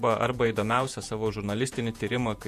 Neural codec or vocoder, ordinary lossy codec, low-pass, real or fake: vocoder, 48 kHz, 128 mel bands, Vocos; MP3, 64 kbps; 14.4 kHz; fake